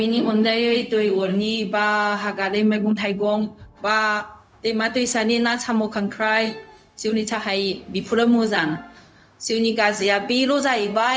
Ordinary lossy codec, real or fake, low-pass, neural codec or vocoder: none; fake; none; codec, 16 kHz, 0.4 kbps, LongCat-Audio-Codec